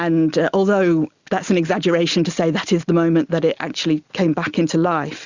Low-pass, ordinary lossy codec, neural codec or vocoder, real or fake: 7.2 kHz; Opus, 64 kbps; none; real